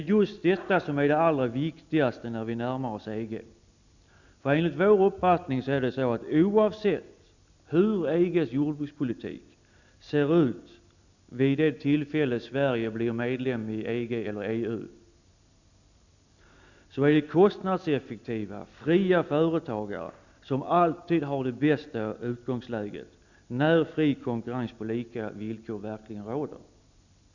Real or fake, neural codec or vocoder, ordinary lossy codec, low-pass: real; none; none; 7.2 kHz